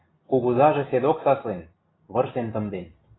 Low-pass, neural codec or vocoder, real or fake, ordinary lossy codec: 7.2 kHz; none; real; AAC, 16 kbps